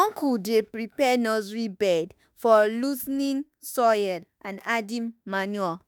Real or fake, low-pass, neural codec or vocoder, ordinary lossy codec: fake; none; autoencoder, 48 kHz, 32 numbers a frame, DAC-VAE, trained on Japanese speech; none